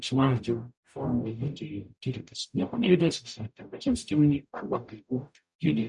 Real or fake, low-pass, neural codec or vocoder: fake; 10.8 kHz; codec, 44.1 kHz, 0.9 kbps, DAC